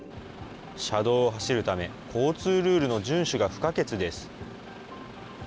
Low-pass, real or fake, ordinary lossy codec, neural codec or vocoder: none; real; none; none